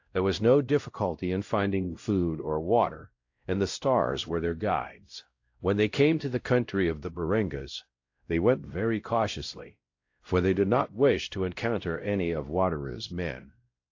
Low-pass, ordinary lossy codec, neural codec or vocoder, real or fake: 7.2 kHz; AAC, 48 kbps; codec, 16 kHz, 0.5 kbps, X-Codec, WavLM features, trained on Multilingual LibriSpeech; fake